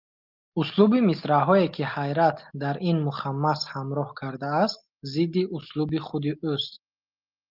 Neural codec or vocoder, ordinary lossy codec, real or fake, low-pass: none; Opus, 32 kbps; real; 5.4 kHz